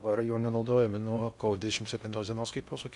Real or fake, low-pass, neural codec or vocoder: fake; 10.8 kHz; codec, 16 kHz in and 24 kHz out, 0.6 kbps, FocalCodec, streaming, 2048 codes